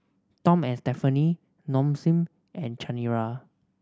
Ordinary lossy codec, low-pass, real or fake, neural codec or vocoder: none; none; real; none